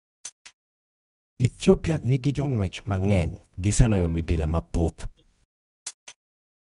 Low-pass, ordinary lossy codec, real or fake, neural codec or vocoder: 10.8 kHz; none; fake; codec, 24 kHz, 0.9 kbps, WavTokenizer, medium music audio release